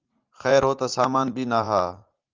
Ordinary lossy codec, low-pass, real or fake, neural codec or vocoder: Opus, 24 kbps; 7.2 kHz; fake; vocoder, 44.1 kHz, 80 mel bands, Vocos